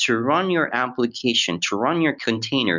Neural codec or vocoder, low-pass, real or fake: none; 7.2 kHz; real